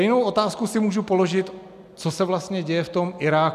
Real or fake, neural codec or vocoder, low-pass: real; none; 14.4 kHz